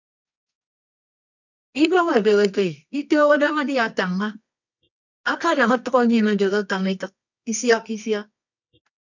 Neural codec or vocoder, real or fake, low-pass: codec, 24 kHz, 0.9 kbps, WavTokenizer, medium music audio release; fake; 7.2 kHz